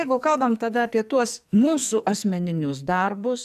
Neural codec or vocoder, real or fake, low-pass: codec, 44.1 kHz, 2.6 kbps, SNAC; fake; 14.4 kHz